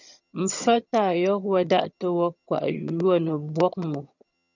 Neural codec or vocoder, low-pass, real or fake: vocoder, 22.05 kHz, 80 mel bands, HiFi-GAN; 7.2 kHz; fake